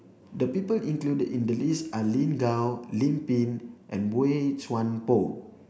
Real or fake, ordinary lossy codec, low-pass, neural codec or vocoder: real; none; none; none